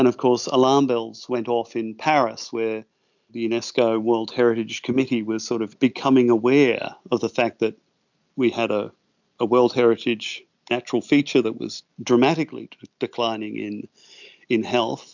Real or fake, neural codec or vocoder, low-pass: real; none; 7.2 kHz